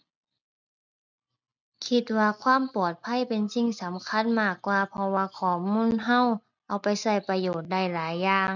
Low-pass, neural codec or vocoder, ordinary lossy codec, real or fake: 7.2 kHz; none; none; real